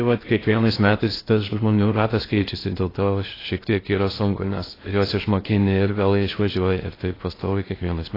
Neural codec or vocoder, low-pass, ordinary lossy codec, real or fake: codec, 16 kHz in and 24 kHz out, 0.6 kbps, FocalCodec, streaming, 4096 codes; 5.4 kHz; AAC, 24 kbps; fake